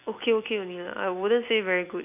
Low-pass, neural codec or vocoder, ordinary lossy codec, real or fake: 3.6 kHz; none; none; real